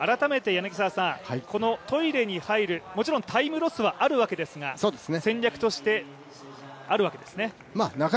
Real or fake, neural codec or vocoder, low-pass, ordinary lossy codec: real; none; none; none